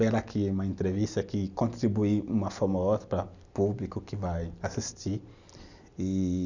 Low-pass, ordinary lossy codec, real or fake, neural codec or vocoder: 7.2 kHz; none; real; none